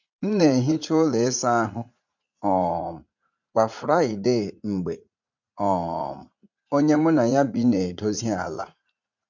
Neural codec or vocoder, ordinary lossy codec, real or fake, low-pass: vocoder, 44.1 kHz, 80 mel bands, Vocos; none; fake; 7.2 kHz